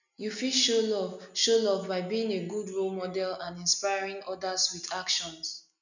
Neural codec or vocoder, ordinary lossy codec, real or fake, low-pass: none; none; real; 7.2 kHz